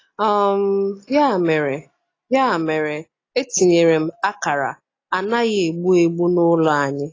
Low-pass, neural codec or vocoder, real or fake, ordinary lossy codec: 7.2 kHz; none; real; AAC, 32 kbps